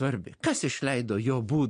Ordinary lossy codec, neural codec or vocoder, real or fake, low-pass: MP3, 48 kbps; none; real; 9.9 kHz